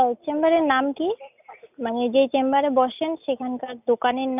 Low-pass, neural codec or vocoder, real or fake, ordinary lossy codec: 3.6 kHz; none; real; none